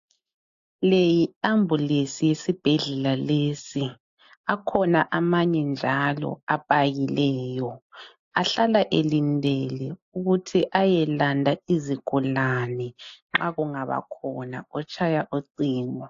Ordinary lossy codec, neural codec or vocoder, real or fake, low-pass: AAC, 48 kbps; none; real; 7.2 kHz